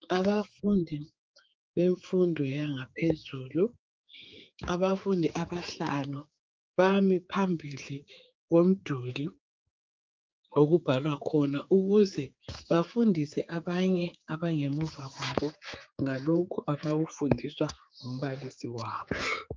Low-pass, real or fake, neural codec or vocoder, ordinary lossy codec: 7.2 kHz; fake; codec, 16 kHz, 4 kbps, X-Codec, HuBERT features, trained on general audio; Opus, 24 kbps